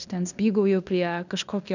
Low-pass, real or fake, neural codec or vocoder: 7.2 kHz; fake; codec, 16 kHz in and 24 kHz out, 0.9 kbps, LongCat-Audio-Codec, fine tuned four codebook decoder